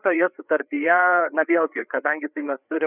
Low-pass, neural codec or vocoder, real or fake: 3.6 kHz; codec, 16 kHz, 4 kbps, FreqCodec, larger model; fake